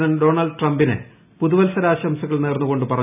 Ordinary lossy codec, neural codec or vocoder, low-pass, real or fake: none; none; 3.6 kHz; real